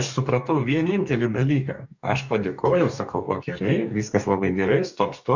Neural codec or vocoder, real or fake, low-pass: codec, 44.1 kHz, 2.6 kbps, DAC; fake; 7.2 kHz